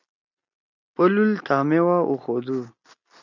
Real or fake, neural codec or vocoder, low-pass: real; none; 7.2 kHz